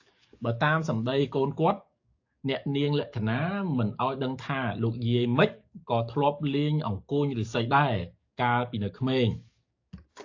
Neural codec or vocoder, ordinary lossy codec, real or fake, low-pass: codec, 44.1 kHz, 7.8 kbps, DAC; AAC, 48 kbps; fake; 7.2 kHz